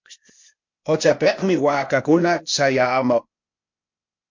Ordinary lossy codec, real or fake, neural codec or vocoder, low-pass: MP3, 48 kbps; fake; codec, 16 kHz, 0.8 kbps, ZipCodec; 7.2 kHz